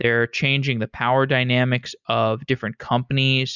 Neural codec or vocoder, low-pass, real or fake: none; 7.2 kHz; real